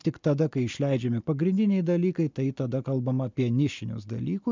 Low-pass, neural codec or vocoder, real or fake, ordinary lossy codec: 7.2 kHz; none; real; MP3, 64 kbps